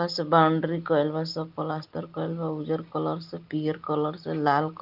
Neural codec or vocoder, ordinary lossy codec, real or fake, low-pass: none; Opus, 32 kbps; real; 5.4 kHz